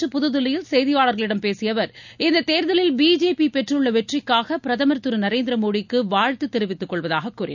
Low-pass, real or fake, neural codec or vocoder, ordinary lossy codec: 7.2 kHz; real; none; none